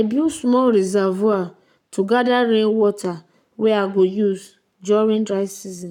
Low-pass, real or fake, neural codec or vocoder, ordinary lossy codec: 19.8 kHz; fake; codec, 44.1 kHz, 7.8 kbps, Pupu-Codec; none